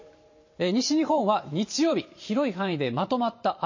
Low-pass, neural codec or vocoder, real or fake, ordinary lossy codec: 7.2 kHz; vocoder, 44.1 kHz, 128 mel bands every 512 samples, BigVGAN v2; fake; MP3, 32 kbps